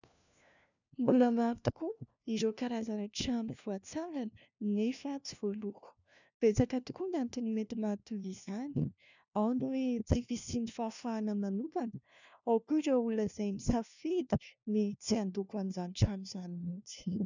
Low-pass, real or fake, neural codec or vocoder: 7.2 kHz; fake; codec, 16 kHz, 1 kbps, FunCodec, trained on LibriTTS, 50 frames a second